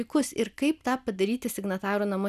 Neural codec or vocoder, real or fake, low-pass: none; real; 14.4 kHz